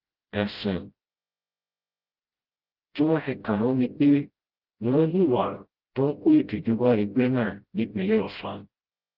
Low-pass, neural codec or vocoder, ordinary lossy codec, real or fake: 5.4 kHz; codec, 16 kHz, 0.5 kbps, FreqCodec, smaller model; Opus, 32 kbps; fake